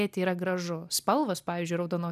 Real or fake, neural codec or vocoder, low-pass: real; none; 14.4 kHz